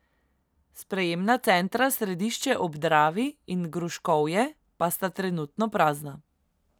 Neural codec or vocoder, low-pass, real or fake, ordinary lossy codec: none; none; real; none